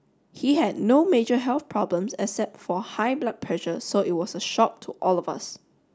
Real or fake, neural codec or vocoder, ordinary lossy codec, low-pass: real; none; none; none